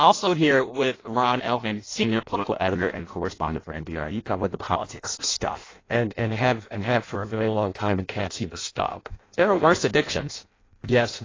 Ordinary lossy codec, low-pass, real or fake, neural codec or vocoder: AAC, 32 kbps; 7.2 kHz; fake; codec, 16 kHz in and 24 kHz out, 0.6 kbps, FireRedTTS-2 codec